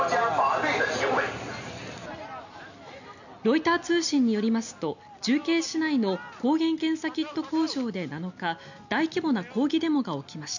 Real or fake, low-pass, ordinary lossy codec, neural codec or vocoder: real; 7.2 kHz; none; none